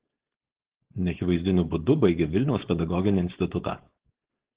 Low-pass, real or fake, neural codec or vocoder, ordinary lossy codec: 3.6 kHz; fake; codec, 16 kHz, 4.8 kbps, FACodec; Opus, 16 kbps